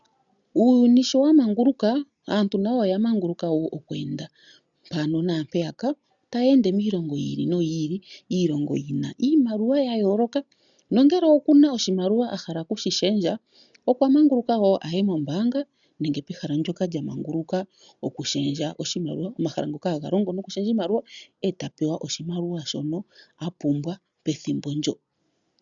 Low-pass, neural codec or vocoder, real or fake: 7.2 kHz; none; real